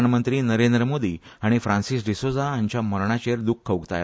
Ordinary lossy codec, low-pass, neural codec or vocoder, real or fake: none; none; none; real